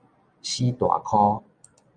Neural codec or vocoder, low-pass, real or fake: none; 9.9 kHz; real